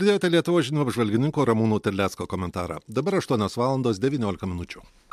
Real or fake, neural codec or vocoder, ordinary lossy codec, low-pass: real; none; MP3, 96 kbps; 14.4 kHz